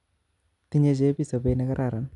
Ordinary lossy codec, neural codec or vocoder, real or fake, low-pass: none; none; real; 10.8 kHz